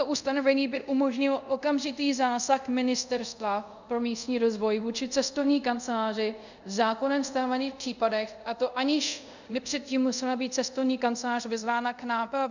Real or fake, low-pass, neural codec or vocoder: fake; 7.2 kHz; codec, 24 kHz, 0.5 kbps, DualCodec